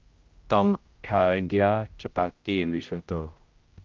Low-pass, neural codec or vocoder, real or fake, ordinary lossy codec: 7.2 kHz; codec, 16 kHz, 0.5 kbps, X-Codec, HuBERT features, trained on general audio; fake; Opus, 32 kbps